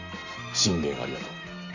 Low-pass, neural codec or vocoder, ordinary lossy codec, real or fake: 7.2 kHz; none; none; real